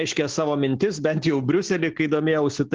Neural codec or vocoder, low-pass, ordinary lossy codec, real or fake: none; 7.2 kHz; Opus, 16 kbps; real